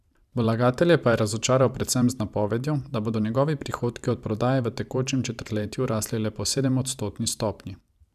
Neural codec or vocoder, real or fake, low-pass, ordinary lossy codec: vocoder, 44.1 kHz, 128 mel bands every 512 samples, BigVGAN v2; fake; 14.4 kHz; none